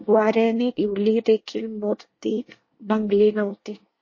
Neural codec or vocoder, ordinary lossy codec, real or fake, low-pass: codec, 24 kHz, 1 kbps, SNAC; MP3, 32 kbps; fake; 7.2 kHz